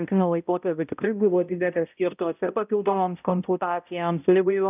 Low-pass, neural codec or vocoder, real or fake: 3.6 kHz; codec, 16 kHz, 0.5 kbps, X-Codec, HuBERT features, trained on balanced general audio; fake